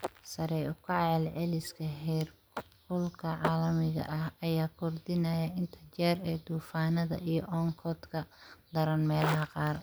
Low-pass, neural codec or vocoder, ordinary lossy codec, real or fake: none; none; none; real